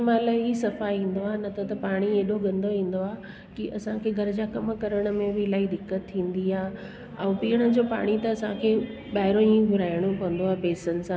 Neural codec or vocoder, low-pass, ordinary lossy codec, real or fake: none; none; none; real